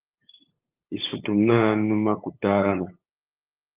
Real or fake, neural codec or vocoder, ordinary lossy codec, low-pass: fake; codec, 16 kHz, 8 kbps, FunCodec, trained on LibriTTS, 25 frames a second; Opus, 24 kbps; 3.6 kHz